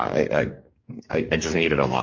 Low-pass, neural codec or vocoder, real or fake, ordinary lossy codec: 7.2 kHz; codec, 44.1 kHz, 2.6 kbps, DAC; fake; MP3, 48 kbps